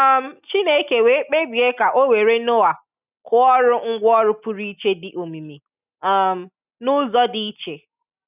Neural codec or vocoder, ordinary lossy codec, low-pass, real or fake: none; none; 3.6 kHz; real